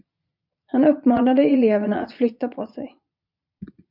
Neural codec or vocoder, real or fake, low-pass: vocoder, 22.05 kHz, 80 mel bands, Vocos; fake; 5.4 kHz